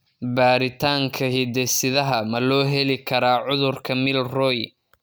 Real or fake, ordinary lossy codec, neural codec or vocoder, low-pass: real; none; none; none